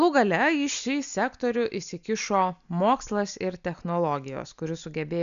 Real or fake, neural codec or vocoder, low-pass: real; none; 7.2 kHz